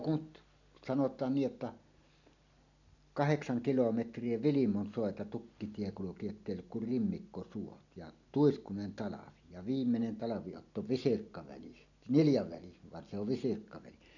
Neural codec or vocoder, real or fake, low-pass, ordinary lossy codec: none; real; 7.2 kHz; none